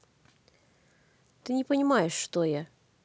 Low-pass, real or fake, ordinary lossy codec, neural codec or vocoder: none; real; none; none